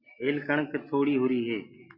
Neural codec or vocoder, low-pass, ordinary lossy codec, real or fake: none; 5.4 kHz; Opus, 64 kbps; real